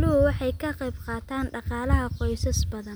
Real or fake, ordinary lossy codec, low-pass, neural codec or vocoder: real; none; none; none